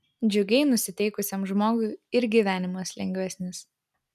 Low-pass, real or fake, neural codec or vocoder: 14.4 kHz; real; none